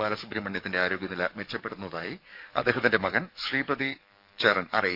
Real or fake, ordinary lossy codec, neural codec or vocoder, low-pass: fake; none; codec, 44.1 kHz, 7.8 kbps, DAC; 5.4 kHz